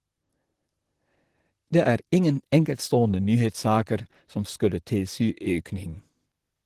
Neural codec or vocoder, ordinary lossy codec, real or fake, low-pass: vocoder, 44.1 kHz, 128 mel bands, Pupu-Vocoder; Opus, 16 kbps; fake; 14.4 kHz